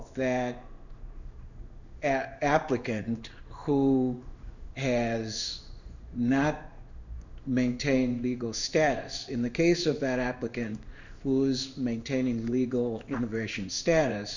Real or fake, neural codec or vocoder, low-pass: fake; codec, 16 kHz in and 24 kHz out, 1 kbps, XY-Tokenizer; 7.2 kHz